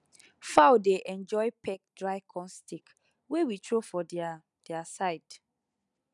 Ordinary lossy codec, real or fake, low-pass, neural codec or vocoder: none; real; 10.8 kHz; none